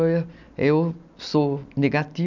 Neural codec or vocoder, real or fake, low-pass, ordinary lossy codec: none; real; 7.2 kHz; none